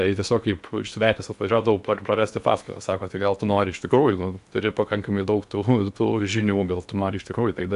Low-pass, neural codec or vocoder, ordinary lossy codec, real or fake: 10.8 kHz; codec, 16 kHz in and 24 kHz out, 0.8 kbps, FocalCodec, streaming, 65536 codes; AAC, 96 kbps; fake